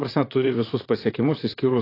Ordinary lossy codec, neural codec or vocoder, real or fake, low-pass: AAC, 24 kbps; vocoder, 44.1 kHz, 128 mel bands, Pupu-Vocoder; fake; 5.4 kHz